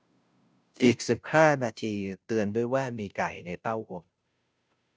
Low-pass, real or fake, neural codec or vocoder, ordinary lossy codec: none; fake; codec, 16 kHz, 0.5 kbps, FunCodec, trained on Chinese and English, 25 frames a second; none